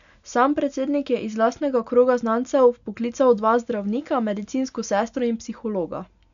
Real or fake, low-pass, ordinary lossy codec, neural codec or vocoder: real; 7.2 kHz; none; none